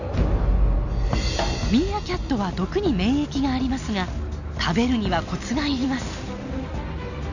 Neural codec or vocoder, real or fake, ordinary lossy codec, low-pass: none; real; none; 7.2 kHz